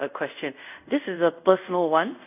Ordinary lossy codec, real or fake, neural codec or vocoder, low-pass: none; fake; codec, 24 kHz, 0.5 kbps, DualCodec; 3.6 kHz